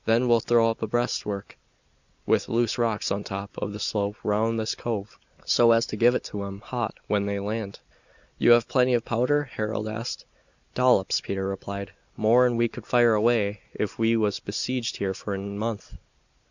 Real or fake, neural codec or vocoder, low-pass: real; none; 7.2 kHz